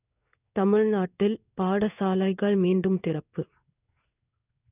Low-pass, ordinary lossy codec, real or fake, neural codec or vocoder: 3.6 kHz; none; fake; codec, 16 kHz in and 24 kHz out, 1 kbps, XY-Tokenizer